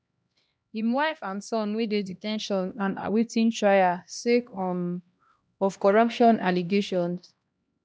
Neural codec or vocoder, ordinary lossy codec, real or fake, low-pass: codec, 16 kHz, 1 kbps, X-Codec, HuBERT features, trained on LibriSpeech; none; fake; none